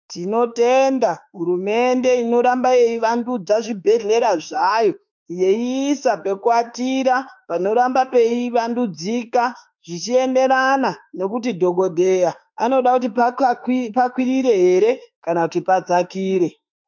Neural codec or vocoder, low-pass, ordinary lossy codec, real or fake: autoencoder, 48 kHz, 32 numbers a frame, DAC-VAE, trained on Japanese speech; 7.2 kHz; MP3, 64 kbps; fake